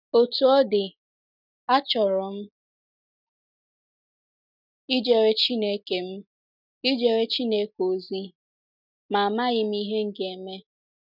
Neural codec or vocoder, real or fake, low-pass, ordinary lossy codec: none; real; 5.4 kHz; none